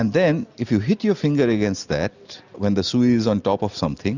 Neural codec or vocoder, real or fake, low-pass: none; real; 7.2 kHz